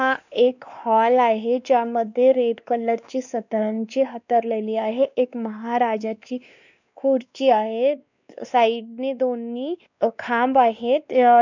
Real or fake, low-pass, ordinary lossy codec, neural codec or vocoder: fake; 7.2 kHz; none; codec, 16 kHz, 2 kbps, X-Codec, WavLM features, trained on Multilingual LibriSpeech